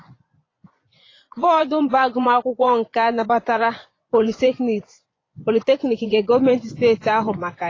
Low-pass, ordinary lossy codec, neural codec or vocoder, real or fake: 7.2 kHz; AAC, 32 kbps; vocoder, 24 kHz, 100 mel bands, Vocos; fake